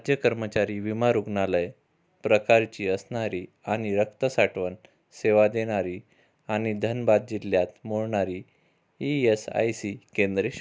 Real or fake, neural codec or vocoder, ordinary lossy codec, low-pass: real; none; none; none